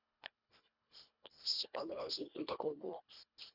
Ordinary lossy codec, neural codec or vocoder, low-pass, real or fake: none; codec, 24 kHz, 1.5 kbps, HILCodec; 5.4 kHz; fake